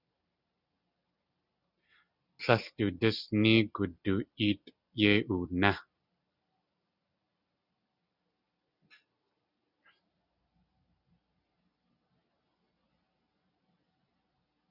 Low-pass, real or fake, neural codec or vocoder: 5.4 kHz; real; none